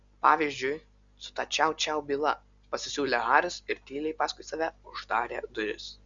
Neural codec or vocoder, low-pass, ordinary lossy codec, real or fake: none; 7.2 kHz; Opus, 64 kbps; real